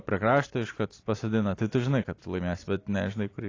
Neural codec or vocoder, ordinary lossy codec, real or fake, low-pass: none; AAC, 32 kbps; real; 7.2 kHz